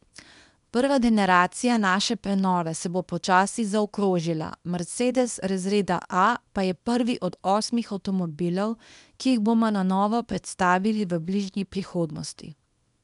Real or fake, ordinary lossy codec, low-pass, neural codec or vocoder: fake; none; 10.8 kHz; codec, 24 kHz, 0.9 kbps, WavTokenizer, small release